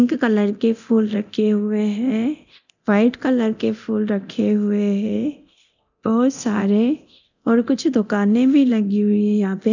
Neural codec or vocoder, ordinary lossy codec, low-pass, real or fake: codec, 24 kHz, 0.9 kbps, DualCodec; none; 7.2 kHz; fake